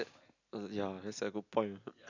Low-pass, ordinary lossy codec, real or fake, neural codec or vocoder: 7.2 kHz; none; real; none